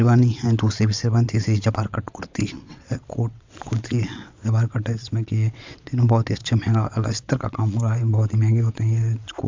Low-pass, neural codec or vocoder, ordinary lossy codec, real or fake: 7.2 kHz; vocoder, 22.05 kHz, 80 mel bands, WaveNeXt; MP3, 64 kbps; fake